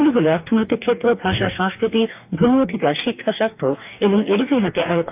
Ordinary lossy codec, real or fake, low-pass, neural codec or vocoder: AAC, 32 kbps; fake; 3.6 kHz; codec, 44.1 kHz, 2.6 kbps, DAC